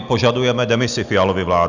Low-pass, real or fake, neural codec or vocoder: 7.2 kHz; real; none